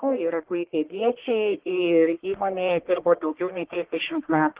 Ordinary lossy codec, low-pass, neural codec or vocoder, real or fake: Opus, 16 kbps; 3.6 kHz; codec, 44.1 kHz, 1.7 kbps, Pupu-Codec; fake